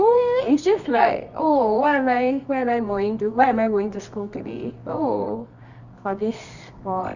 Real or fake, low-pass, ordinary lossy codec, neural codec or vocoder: fake; 7.2 kHz; none; codec, 24 kHz, 0.9 kbps, WavTokenizer, medium music audio release